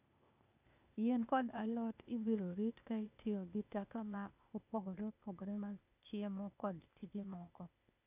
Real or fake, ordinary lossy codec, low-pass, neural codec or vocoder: fake; none; 3.6 kHz; codec, 16 kHz, 0.8 kbps, ZipCodec